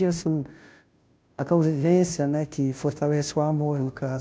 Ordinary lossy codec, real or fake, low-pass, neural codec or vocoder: none; fake; none; codec, 16 kHz, 0.5 kbps, FunCodec, trained on Chinese and English, 25 frames a second